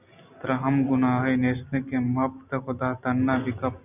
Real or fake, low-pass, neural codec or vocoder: real; 3.6 kHz; none